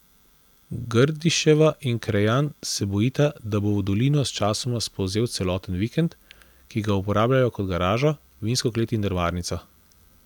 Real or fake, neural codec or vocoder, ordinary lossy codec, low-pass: real; none; none; 19.8 kHz